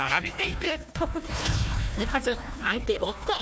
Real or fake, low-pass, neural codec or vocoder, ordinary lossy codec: fake; none; codec, 16 kHz, 1 kbps, FunCodec, trained on Chinese and English, 50 frames a second; none